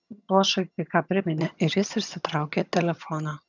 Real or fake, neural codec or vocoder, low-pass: fake; vocoder, 22.05 kHz, 80 mel bands, HiFi-GAN; 7.2 kHz